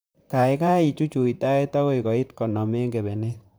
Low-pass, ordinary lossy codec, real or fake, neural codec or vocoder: none; none; fake; vocoder, 44.1 kHz, 128 mel bands, Pupu-Vocoder